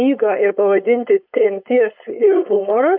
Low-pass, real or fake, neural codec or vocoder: 5.4 kHz; fake; codec, 16 kHz, 4.8 kbps, FACodec